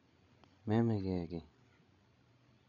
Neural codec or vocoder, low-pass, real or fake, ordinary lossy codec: codec, 16 kHz, 16 kbps, FreqCodec, larger model; 7.2 kHz; fake; none